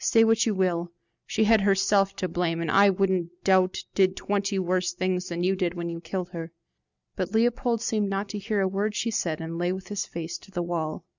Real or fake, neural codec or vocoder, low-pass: real; none; 7.2 kHz